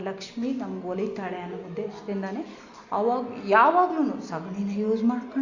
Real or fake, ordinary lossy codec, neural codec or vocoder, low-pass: real; Opus, 64 kbps; none; 7.2 kHz